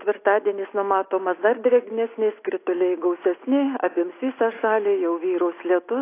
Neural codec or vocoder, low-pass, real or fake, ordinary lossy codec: none; 3.6 kHz; real; AAC, 24 kbps